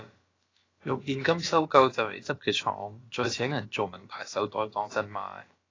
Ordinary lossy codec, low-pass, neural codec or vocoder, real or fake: AAC, 32 kbps; 7.2 kHz; codec, 16 kHz, about 1 kbps, DyCAST, with the encoder's durations; fake